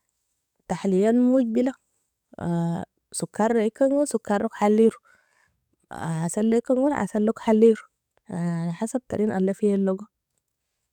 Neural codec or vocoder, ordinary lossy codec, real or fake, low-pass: codec, 44.1 kHz, 7.8 kbps, DAC; none; fake; 19.8 kHz